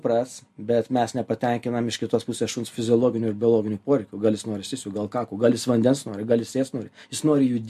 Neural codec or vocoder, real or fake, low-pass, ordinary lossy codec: none; real; 14.4 kHz; MP3, 64 kbps